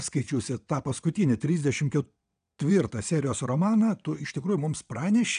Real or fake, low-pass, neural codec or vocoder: fake; 9.9 kHz; vocoder, 22.05 kHz, 80 mel bands, WaveNeXt